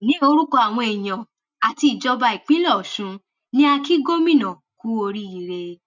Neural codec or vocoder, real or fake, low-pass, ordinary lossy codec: none; real; 7.2 kHz; none